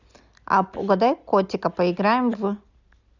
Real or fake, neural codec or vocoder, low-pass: real; none; 7.2 kHz